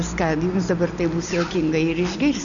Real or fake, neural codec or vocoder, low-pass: real; none; 7.2 kHz